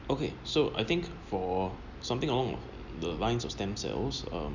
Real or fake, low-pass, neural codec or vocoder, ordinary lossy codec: real; 7.2 kHz; none; none